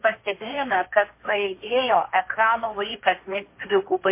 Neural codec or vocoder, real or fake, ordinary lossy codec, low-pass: codec, 16 kHz, 1.1 kbps, Voila-Tokenizer; fake; MP3, 24 kbps; 3.6 kHz